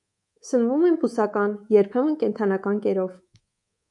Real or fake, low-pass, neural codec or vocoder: fake; 10.8 kHz; codec, 24 kHz, 3.1 kbps, DualCodec